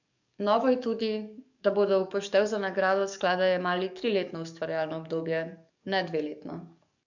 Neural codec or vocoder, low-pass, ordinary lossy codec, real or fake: codec, 44.1 kHz, 7.8 kbps, DAC; 7.2 kHz; none; fake